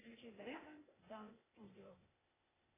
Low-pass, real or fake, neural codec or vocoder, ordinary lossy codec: 3.6 kHz; fake; codec, 16 kHz, 0.8 kbps, ZipCodec; AAC, 16 kbps